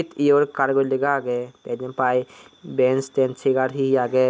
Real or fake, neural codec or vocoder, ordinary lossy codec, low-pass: real; none; none; none